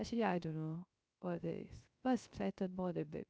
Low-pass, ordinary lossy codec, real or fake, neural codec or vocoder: none; none; fake; codec, 16 kHz, 0.3 kbps, FocalCodec